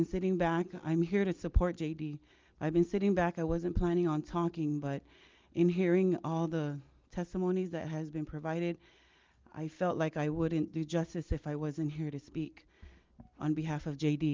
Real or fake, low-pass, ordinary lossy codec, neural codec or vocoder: real; 7.2 kHz; Opus, 32 kbps; none